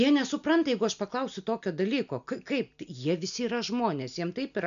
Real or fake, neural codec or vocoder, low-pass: real; none; 7.2 kHz